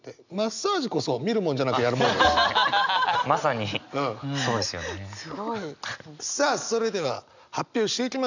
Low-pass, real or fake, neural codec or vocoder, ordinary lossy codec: 7.2 kHz; real; none; none